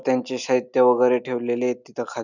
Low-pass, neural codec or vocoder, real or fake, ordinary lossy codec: 7.2 kHz; none; real; none